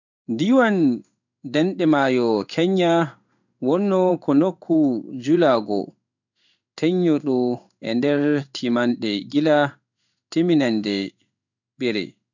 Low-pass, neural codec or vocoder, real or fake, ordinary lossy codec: 7.2 kHz; codec, 16 kHz in and 24 kHz out, 1 kbps, XY-Tokenizer; fake; none